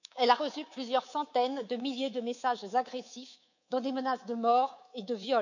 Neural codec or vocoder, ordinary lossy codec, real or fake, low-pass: codec, 24 kHz, 3.1 kbps, DualCodec; none; fake; 7.2 kHz